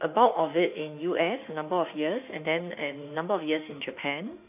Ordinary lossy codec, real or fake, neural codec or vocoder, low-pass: AAC, 32 kbps; fake; autoencoder, 48 kHz, 32 numbers a frame, DAC-VAE, trained on Japanese speech; 3.6 kHz